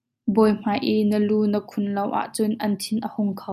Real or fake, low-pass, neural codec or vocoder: real; 14.4 kHz; none